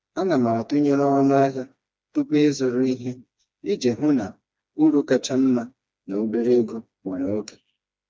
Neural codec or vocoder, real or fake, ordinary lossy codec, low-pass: codec, 16 kHz, 2 kbps, FreqCodec, smaller model; fake; none; none